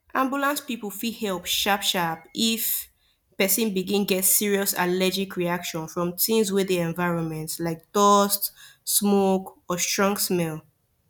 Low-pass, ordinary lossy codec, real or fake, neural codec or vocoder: 19.8 kHz; none; real; none